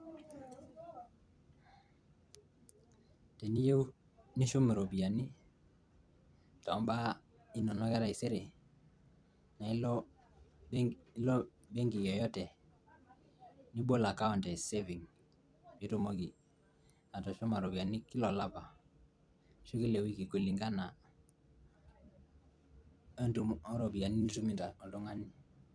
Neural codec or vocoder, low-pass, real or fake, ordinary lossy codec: vocoder, 44.1 kHz, 128 mel bands every 256 samples, BigVGAN v2; 9.9 kHz; fake; none